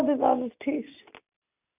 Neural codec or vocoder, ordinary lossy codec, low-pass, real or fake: none; none; 3.6 kHz; real